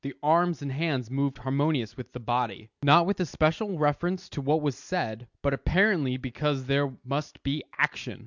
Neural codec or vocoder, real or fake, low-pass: none; real; 7.2 kHz